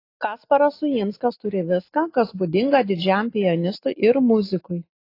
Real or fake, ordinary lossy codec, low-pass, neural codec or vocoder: real; AAC, 32 kbps; 5.4 kHz; none